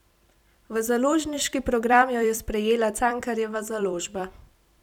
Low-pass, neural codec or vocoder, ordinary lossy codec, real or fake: 19.8 kHz; vocoder, 44.1 kHz, 128 mel bands every 256 samples, BigVGAN v2; none; fake